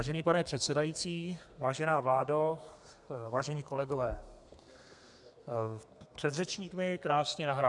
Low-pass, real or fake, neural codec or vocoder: 10.8 kHz; fake; codec, 32 kHz, 1.9 kbps, SNAC